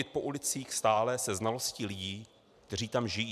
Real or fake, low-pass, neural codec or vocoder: real; 14.4 kHz; none